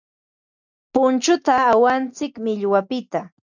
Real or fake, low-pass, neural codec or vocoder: real; 7.2 kHz; none